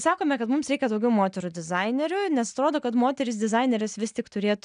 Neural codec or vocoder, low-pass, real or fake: none; 9.9 kHz; real